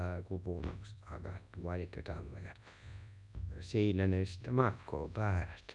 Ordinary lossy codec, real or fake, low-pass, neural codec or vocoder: none; fake; 10.8 kHz; codec, 24 kHz, 0.9 kbps, WavTokenizer, large speech release